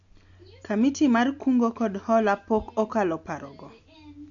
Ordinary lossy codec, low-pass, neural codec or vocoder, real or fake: AAC, 64 kbps; 7.2 kHz; none; real